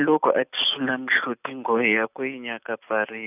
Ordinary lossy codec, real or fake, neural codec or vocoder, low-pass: none; real; none; 3.6 kHz